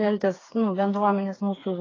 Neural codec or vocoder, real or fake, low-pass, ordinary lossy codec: codec, 16 kHz, 4 kbps, FreqCodec, smaller model; fake; 7.2 kHz; AAC, 32 kbps